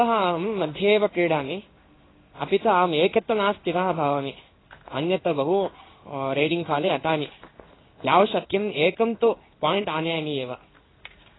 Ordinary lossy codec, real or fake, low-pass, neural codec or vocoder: AAC, 16 kbps; fake; 7.2 kHz; codec, 16 kHz in and 24 kHz out, 1 kbps, XY-Tokenizer